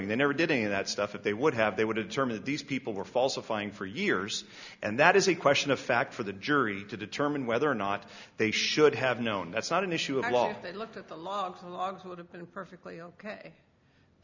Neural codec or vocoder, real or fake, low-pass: none; real; 7.2 kHz